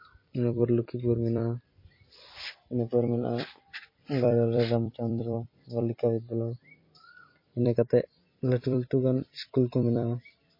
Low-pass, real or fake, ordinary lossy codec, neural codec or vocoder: 5.4 kHz; fake; MP3, 24 kbps; vocoder, 44.1 kHz, 128 mel bands every 256 samples, BigVGAN v2